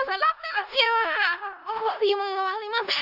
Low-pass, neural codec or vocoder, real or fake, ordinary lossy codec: 5.4 kHz; codec, 16 kHz in and 24 kHz out, 0.4 kbps, LongCat-Audio-Codec, four codebook decoder; fake; none